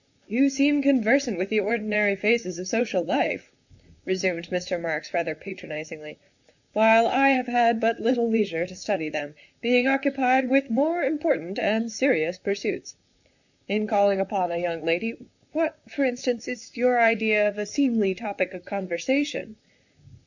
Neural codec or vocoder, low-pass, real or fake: vocoder, 44.1 kHz, 128 mel bands, Pupu-Vocoder; 7.2 kHz; fake